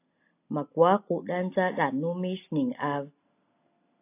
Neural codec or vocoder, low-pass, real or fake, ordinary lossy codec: none; 3.6 kHz; real; AAC, 24 kbps